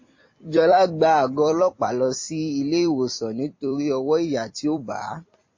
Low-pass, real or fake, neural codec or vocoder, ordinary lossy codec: 7.2 kHz; fake; vocoder, 44.1 kHz, 128 mel bands every 256 samples, BigVGAN v2; MP3, 32 kbps